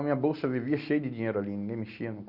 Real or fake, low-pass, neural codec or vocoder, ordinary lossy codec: real; 5.4 kHz; none; Opus, 64 kbps